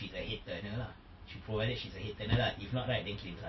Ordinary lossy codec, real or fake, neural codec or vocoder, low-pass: MP3, 24 kbps; real; none; 7.2 kHz